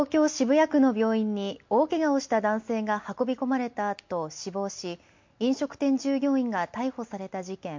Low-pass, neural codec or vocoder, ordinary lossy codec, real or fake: 7.2 kHz; none; MP3, 48 kbps; real